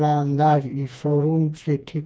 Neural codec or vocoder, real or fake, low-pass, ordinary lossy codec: codec, 16 kHz, 2 kbps, FreqCodec, smaller model; fake; none; none